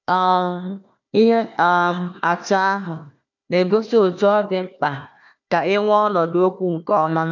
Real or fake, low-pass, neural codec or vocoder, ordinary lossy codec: fake; 7.2 kHz; codec, 16 kHz, 1 kbps, FunCodec, trained on Chinese and English, 50 frames a second; none